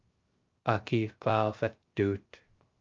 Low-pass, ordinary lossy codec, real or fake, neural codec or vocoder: 7.2 kHz; Opus, 32 kbps; fake; codec, 16 kHz, 0.3 kbps, FocalCodec